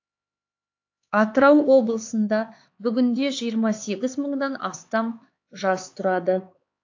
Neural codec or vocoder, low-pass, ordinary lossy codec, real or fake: codec, 16 kHz, 4 kbps, X-Codec, HuBERT features, trained on LibriSpeech; 7.2 kHz; AAC, 48 kbps; fake